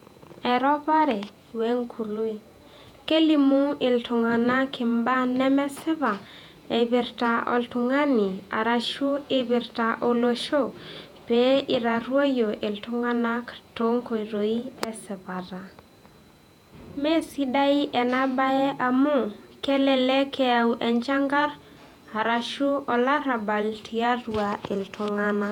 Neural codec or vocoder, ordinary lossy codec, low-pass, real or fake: vocoder, 48 kHz, 128 mel bands, Vocos; none; 19.8 kHz; fake